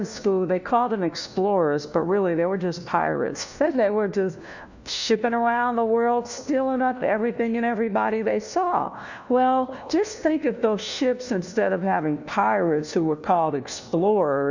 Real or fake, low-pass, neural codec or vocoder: fake; 7.2 kHz; codec, 16 kHz, 1 kbps, FunCodec, trained on LibriTTS, 50 frames a second